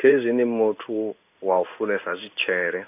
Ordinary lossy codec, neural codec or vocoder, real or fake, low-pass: none; codec, 16 kHz in and 24 kHz out, 1 kbps, XY-Tokenizer; fake; 3.6 kHz